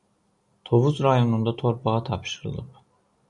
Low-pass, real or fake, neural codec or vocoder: 10.8 kHz; real; none